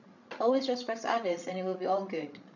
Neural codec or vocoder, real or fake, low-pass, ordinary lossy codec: codec, 16 kHz, 16 kbps, FreqCodec, larger model; fake; 7.2 kHz; none